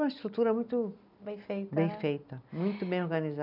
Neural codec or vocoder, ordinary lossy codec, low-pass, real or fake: none; none; 5.4 kHz; real